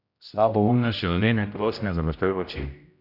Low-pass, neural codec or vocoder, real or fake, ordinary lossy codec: 5.4 kHz; codec, 16 kHz, 0.5 kbps, X-Codec, HuBERT features, trained on general audio; fake; none